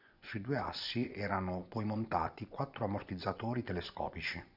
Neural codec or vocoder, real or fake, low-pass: vocoder, 24 kHz, 100 mel bands, Vocos; fake; 5.4 kHz